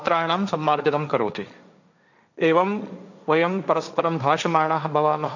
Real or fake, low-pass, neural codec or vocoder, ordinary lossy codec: fake; 7.2 kHz; codec, 16 kHz, 1.1 kbps, Voila-Tokenizer; none